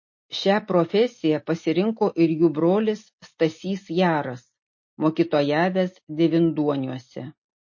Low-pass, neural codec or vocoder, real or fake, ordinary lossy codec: 7.2 kHz; none; real; MP3, 32 kbps